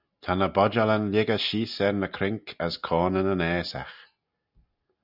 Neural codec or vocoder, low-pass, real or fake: none; 5.4 kHz; real